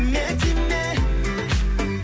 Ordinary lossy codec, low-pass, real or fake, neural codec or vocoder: none; none; real; none